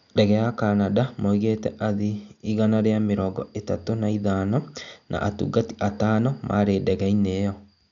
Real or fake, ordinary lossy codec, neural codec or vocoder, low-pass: real; none; none; 7.2 kHz